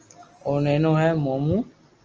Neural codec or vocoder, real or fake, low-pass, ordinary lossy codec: none; real; 7.2 kHz; Opus, 24 kbps